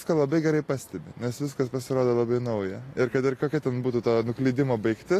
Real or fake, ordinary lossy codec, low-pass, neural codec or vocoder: real; AAC, 48 kbps; 14.4 kHz; none